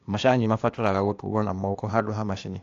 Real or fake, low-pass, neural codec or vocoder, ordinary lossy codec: fake; 7.2 kHz; codec, 16 kHz, 0.8 kbps, ZipCodec; AAC, 48 kbps